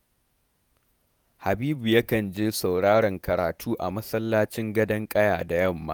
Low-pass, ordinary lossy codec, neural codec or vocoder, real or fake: none; none; vocoder, 48 kHz, 128 mel bands, Vocos; fake